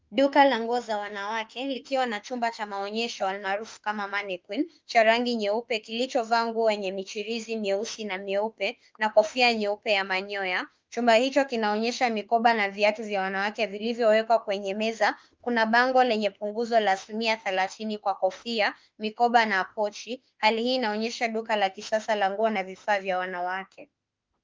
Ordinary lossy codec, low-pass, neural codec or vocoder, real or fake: Opus, 24 kbps; 7.2 kHz; autoencoder, 48 kHz, 32 numbers a frame, DAC-VAE, trained on Japanese speech; fake